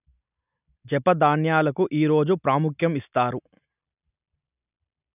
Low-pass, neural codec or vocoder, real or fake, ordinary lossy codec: 3.6 kHz; none; real; none